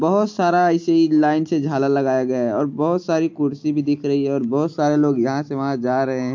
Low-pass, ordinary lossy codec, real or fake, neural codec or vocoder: 7.2 kHz; MP3, 48 kbps; real; none